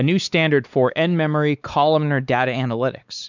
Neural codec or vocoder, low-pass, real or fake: codec, 16 kHz, 4 kbps, X-Codec, WavLM features, trained on Multilingual LibriSpeech; 7.2 kHz; fake